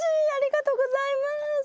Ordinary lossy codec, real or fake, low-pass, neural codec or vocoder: none; real; none; none